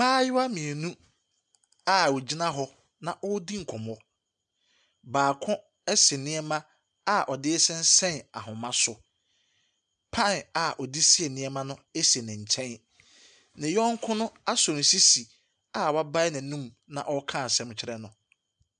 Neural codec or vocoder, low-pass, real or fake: none; 9.9 kHz; real